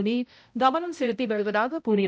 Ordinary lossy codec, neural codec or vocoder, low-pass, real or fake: none; codec, 16 kHz, 0.5 kbps, X-Codec, HuBERT features, trained on balanced general audio; none; fake